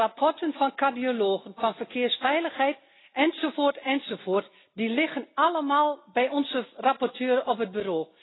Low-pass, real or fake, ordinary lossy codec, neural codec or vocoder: 7.2 kHz; real; AAC, 16 kbps; none